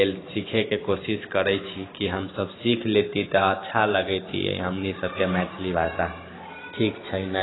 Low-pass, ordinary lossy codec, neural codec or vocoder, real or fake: 7.2 kHz; AAC, 16 kbps; vocoder, 44.1 kHz, 128 mel bands every 512 samples, BigVGAN v2; fake